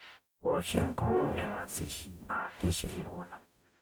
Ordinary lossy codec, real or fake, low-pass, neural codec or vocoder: none; fake; none; codec, 44.1 kHz, 0.9 kbps, DAC